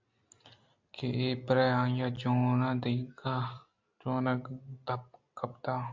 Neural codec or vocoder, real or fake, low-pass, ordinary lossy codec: none; real; 7.2 kHz; MP3, 48 kbps